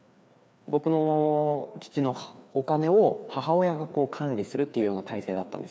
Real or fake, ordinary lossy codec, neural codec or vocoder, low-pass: fake; none; codec, 16 kHz, 2 kbps, FreqCodec, larger model; none